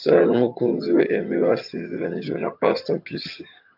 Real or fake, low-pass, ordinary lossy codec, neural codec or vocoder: fake; 5.4 kHz; AAC, 48 kbps; vocoder, 22.05 kHz, 80 mel bands, HiFi-GAN